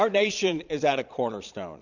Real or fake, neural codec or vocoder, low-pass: fake; vocoder, 22.05 kHz, 80 mel bands, Vocos; 7.2 kHz